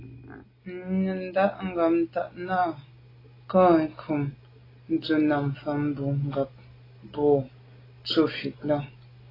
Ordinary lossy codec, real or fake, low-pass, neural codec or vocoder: AAC, 24 kbps; real; 5.4 kHz; none